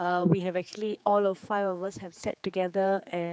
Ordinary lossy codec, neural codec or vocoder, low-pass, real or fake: none; codec, 16 kHz, 4 kbps, X-Codec, HuBERT features, trained on general audio; none; fake